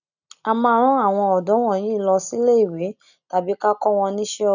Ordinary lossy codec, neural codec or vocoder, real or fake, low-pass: none; none; real; 7.2 kHz